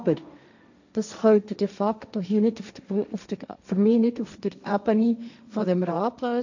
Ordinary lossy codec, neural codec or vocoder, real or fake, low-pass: none; codec, 16 kHz, 1.1 kbps, Voila-Tokenizer; fake; 7.2 kHz